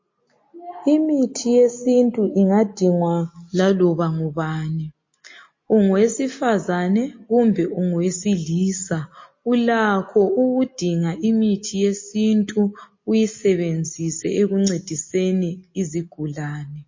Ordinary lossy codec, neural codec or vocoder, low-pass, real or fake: MP3, 32 kbps; none; 7.2 kHz; real